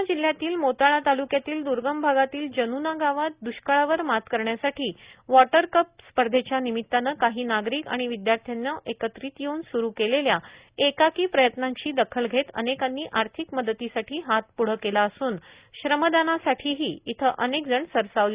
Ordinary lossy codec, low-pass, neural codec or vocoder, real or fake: Opus, 64 kbps; 3.6 kHz; none; real